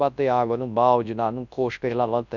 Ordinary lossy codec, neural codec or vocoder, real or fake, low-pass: none; codec, 24 kHz, 0.9 kbps, WavTokenizer, large speech release; fake; 7.2 kHz